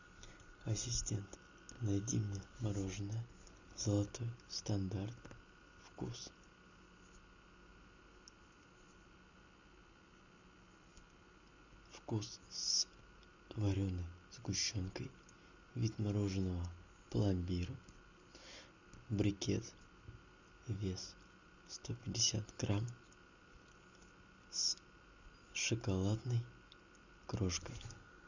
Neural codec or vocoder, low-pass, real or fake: none; 7.2 kHz; real